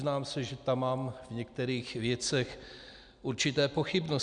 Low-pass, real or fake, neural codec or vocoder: 9.9 kHz; real; none